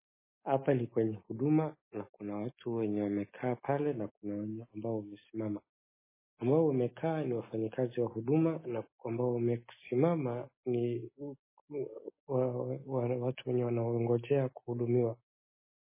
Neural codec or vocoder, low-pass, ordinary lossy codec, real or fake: none; 3.6 kHz; MP3, 16 kbps; real